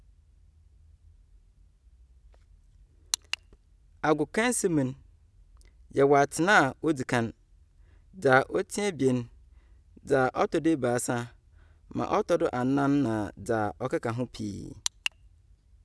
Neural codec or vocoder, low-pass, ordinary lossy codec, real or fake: none; none; none; real